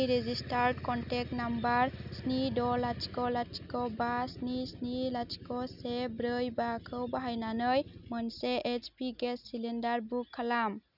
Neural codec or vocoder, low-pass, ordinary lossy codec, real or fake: none; 5.4 kHz; none; real